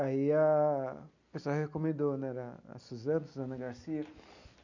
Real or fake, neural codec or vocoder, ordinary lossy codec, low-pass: real; none; none; 7.2 kHz